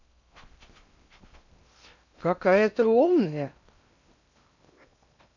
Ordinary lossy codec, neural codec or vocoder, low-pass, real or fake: none; codec, 16 kHz in and 24 kHz out, 0.8 kbps, FocalCodec, streaming, 65536 codes; 7.2 kHz; fake